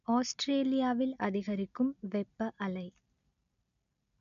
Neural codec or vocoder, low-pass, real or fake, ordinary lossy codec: none; 7.2 kHz; real; none